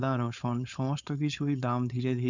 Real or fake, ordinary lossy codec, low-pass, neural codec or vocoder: fake; none; 7.2 kHz; codec, 16 kHz, 4.8 kbps, FACodec